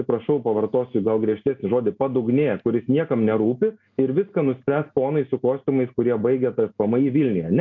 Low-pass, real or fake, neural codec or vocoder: 7.2 kHz; real; none